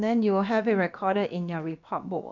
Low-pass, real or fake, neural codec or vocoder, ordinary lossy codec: 7.2 kHz; fake; codec, 16 kHz, about 1 kbps, DyCAST, with the encoder's durations; none